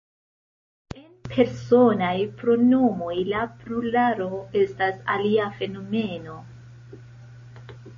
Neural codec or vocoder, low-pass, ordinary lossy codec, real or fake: none; 7.2 kHz; MP3, 32 kbps; real